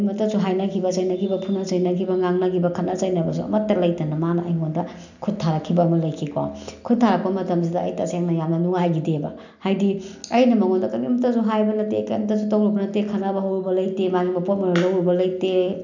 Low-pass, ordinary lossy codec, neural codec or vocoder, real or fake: 7.2 kHz; none; none; real